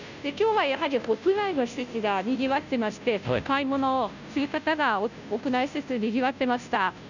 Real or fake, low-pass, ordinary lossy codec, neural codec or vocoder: fake; 7.2 kHz; none; codec, 16 kHz, 0.5 kbps, FunCodec, trained on Chinese and English, 25 frames a second